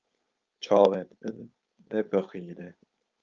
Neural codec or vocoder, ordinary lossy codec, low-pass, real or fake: codec, 16 kHz, 4.8 kbps, FACodec; Opus, 24 kbps; 7.2 kHz; fake